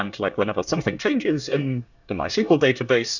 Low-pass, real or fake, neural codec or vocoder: 7.2 kHz; fake; codec, 24 kHz, 1 kbps, SNAC